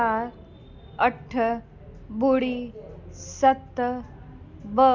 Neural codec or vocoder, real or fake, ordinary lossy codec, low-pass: none; real; MP3, 64 kbps; 7.2 kHz